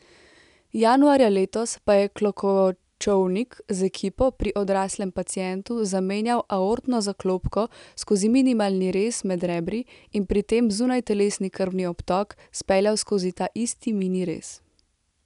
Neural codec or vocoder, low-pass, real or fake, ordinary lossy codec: none; 10.8 kHz; real; none